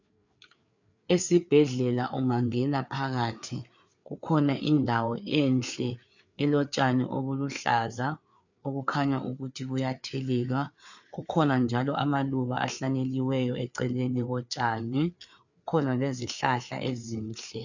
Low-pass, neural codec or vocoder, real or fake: 7.2 kHz; codec, 16 kHz, 4 kbps, FreqCodec, larger model; fake